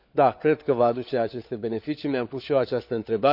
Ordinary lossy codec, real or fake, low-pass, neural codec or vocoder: none; fake; 5.4 kHz; codec, 16 kHz, 4 kbps, FunCodec, trained on LibriTTS, 50 frames a second